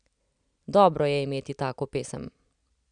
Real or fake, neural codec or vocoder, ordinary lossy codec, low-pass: real; none; none; 9.9 kHz